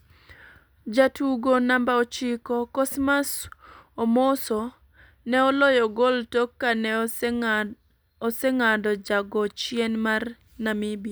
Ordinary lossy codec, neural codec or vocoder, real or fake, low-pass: none; none; real; none